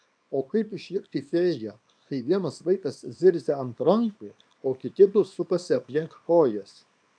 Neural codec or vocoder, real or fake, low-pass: codec, 24 kHz, 0.9 kbps, WavTokenizer, small release; fake; 9.9 kHz